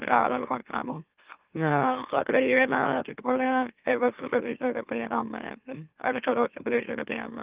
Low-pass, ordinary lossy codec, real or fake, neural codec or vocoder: 3.6 kHz; Opus, 24 kbps; fake; autoencoder, 44.1 kHz, a latent of 192 numbers a frame, MeloTTS